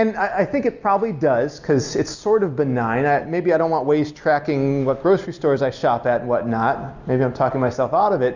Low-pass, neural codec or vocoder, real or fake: 7.2 kHz; none; real